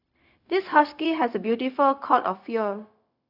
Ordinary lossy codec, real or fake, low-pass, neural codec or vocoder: none; fake; 5.4 kHz; codec, 16 kHz, 0.4 kbps, LongCat-Audio-Codec